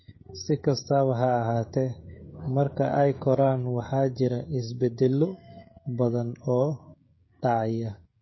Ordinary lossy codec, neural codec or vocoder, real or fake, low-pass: MP3, 24 kbps; codec, 16 kHz, 16 kbps, FreqCodec, smaller model; fake; 7.2 kHz